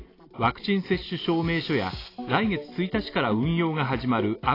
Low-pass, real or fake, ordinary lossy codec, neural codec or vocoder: 5.4 kHz; real; AAC, 24 kbps; none